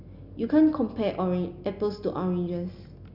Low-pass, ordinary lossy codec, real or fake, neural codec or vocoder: 5.4 kHz; none; real; none